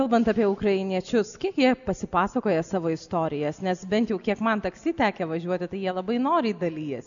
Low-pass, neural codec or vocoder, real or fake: 7.2 kHz; none; real